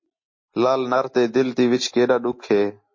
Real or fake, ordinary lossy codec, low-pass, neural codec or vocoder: real; MP3, 32 kbps; 7.2 kHz; none